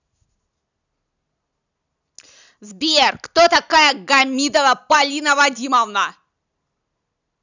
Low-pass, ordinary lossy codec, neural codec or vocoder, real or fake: 7.2 kHz; none; none; real